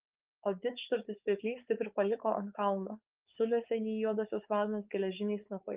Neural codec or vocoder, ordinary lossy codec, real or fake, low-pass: codec, 16 kHz, 4.8 kbps, FACodec; Opus, 32 kbps; fake; 3.6 kHz